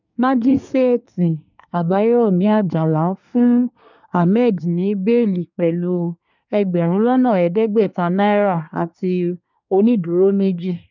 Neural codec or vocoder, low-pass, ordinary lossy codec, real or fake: codec, 24 kHz, 1 kbps, SNAC; 7.2 kHz; none; fake